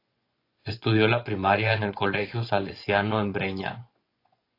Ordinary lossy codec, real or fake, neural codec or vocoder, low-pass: AAC, 32 kbps; fake; vocoder, 24 kHz, 100 mel bands, Vocos; 5.4 kHz